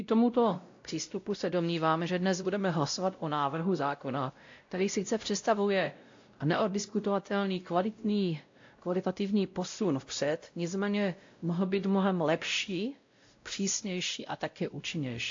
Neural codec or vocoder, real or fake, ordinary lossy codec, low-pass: codec, 16 kHz, 0.5 kbps, X-Codec, WavLM features, trained on Multilingual LibriSpeech; fake; AAC, 48 kbps; 7.2 kHz